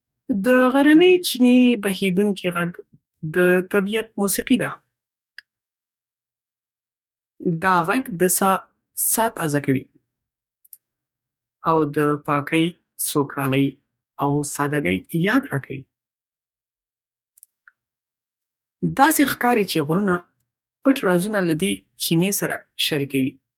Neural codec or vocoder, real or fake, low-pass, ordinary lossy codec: codec, 44.1 kHz, 2.6 kbps, DAC; fake; 19.8 kHz; none